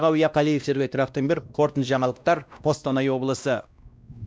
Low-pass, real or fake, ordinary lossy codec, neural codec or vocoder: none; fake; none; codec, 16 kHz, 1 kbps, X-Codec, WavLM features, trained on Multilingual LibriSpeech